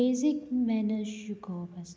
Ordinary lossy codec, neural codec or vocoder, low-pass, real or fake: none; none; none; real